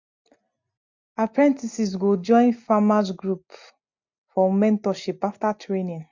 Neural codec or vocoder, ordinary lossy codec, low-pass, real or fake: none; MP3, 64 kbps; 7.2 kHz; real